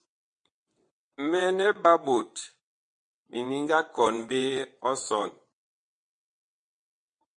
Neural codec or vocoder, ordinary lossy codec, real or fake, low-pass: vocoder, 22.05 kHz, 80 mel bands, WaveNeXt; MP3, 48 kbps; fake; 9.9 kHz